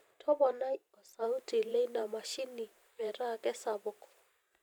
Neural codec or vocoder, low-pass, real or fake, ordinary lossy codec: vocoder, 44.1 kHz, 128 mel bands every 512 samples, BigVGAN v2; none; fake; none